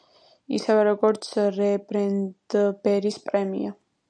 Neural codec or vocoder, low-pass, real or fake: none; 9.9 kHz; real